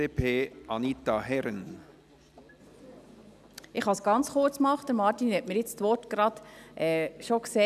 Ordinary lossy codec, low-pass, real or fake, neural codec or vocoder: none; 14.4 kHz; real; none